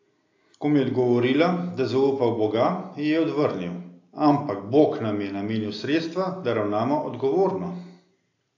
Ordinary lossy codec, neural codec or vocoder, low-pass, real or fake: AAC, 48 kbps; none; 7.2 kHz; real